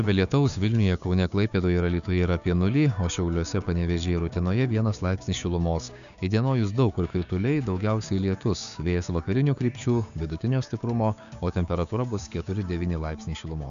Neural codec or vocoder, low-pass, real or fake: codec, 16 kHz, 6 kbps, DAC; 7.2 kHz; fake